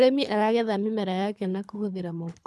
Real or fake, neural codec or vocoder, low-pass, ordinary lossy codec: fake; codec, 24 kHz, 3 kbps, HILCodec; none; none